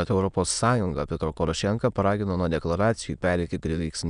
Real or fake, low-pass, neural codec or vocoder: fake; 9.9 kHz; autoencoder, 22.05 kHz, a latent of 192 numbers a frame, VITS, trained on many speakers